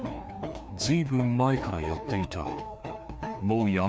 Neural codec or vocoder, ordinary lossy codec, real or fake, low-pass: codec, 16 kHz, 2 kbps, FreqCodec, larger model; none; fake; none